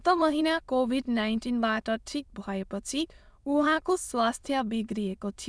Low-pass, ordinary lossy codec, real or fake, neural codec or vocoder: none; none; fake; autoencoder, 22.05 kHz, a latent of 192 numbers a frame, VITS, trained on many speakers